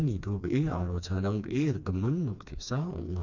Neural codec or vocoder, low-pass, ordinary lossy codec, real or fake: codec, 16 kHz, 2 kbps, FreqCodec, smaller model; 7.2 kHz; none; fake